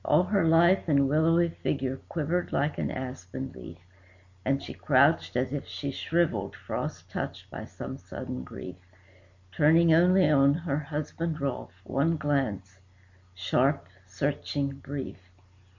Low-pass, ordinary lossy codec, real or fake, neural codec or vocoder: 7.2 kHz; MP3, 48 kbps; real; none